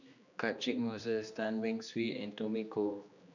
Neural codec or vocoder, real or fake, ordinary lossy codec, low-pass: codec, 16 kHz, 2 kbps, X-Codec, HuBERT features, trained on general audio; fake; none; 7.2 kHz